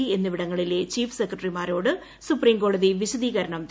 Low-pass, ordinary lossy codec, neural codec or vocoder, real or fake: none; none; none; real